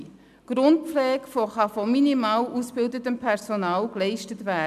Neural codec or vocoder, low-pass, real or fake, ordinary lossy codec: none; 14.4 kHz; real; none